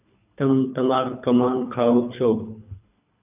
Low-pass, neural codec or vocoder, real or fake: 3.6 kHz; codec, 24 kHz, 3 kbps, HILCodec; fake